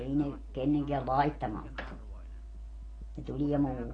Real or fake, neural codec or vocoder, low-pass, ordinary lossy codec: real; none; 9.9 kHz; AAC, 32 kbps